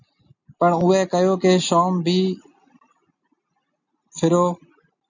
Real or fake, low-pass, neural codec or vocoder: real; 7.2 kHz; none